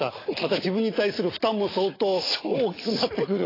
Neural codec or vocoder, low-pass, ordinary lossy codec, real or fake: none; 5.4 kHz; AAC, 24 kbps; real